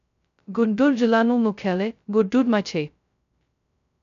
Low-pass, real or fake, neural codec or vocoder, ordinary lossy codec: 7.2 kHz; fake; codec, 16 kHz, 0.2 kbps, FocalCodec; none